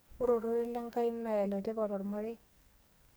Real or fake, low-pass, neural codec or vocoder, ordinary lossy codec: fake; none; codec, 44.1 kHz, 2.6 kbps, SNAC; none